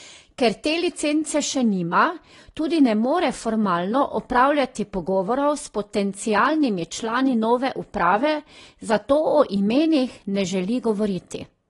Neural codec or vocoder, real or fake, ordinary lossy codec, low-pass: none; real; AAC, 32 kbps; 10.8 kHz